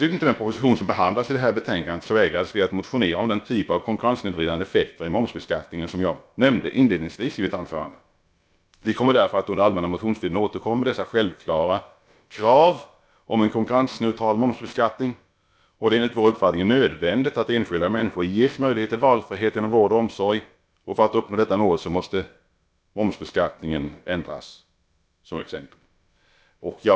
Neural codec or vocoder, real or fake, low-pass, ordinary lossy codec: codec, 16 kHz, about 1 kbps, DyCAST, with the encoder's durations; fake; none; none